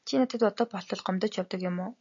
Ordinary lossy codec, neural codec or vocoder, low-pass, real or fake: AAC, 64 kbps; none; 7.2 kHz; real